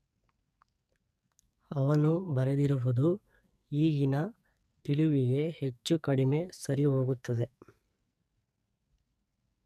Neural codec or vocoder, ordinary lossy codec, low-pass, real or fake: codec, 44.1 kHz, 2.6 kbps, SNAC; none; 14.4 kHz; fake